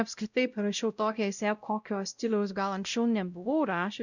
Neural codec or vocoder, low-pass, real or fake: codec, 16 kHz, 0.5 kbps, X-Codec, WavLM features, trained on Multilingual LibriSpeech; 7.2 kHz; fake